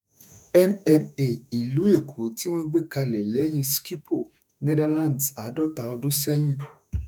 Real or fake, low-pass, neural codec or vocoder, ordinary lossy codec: fake; none; autoencoder, 48 kHz, 32 numbers a frame, DAC-VAE, trained on Japanese speech; none